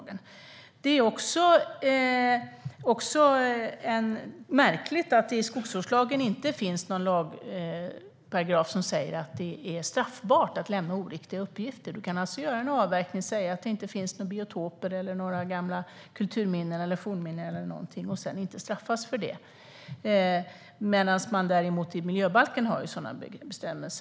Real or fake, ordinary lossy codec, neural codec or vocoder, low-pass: real; none; none; none